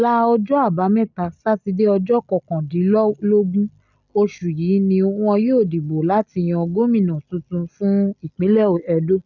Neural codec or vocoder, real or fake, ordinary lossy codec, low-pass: none; real; none; 7.2 kHz